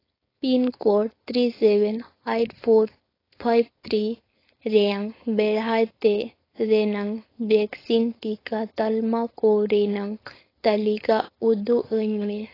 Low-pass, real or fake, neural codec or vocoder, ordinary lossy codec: 5.4 kHz; fake; codec, 16 kHz, 4.8 kbps, FACodec; AAC, 24 kbps